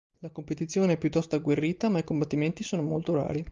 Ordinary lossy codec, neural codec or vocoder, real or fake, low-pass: Opus, 32 kbps; none; real; 7.2 kHz